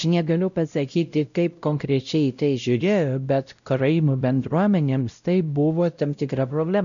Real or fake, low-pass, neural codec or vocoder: fake; 7.2 kHz; codec, 16 kHz, 0.5 kbps, X-Codec, WavLM features, trained on Multilingual LibriSpeech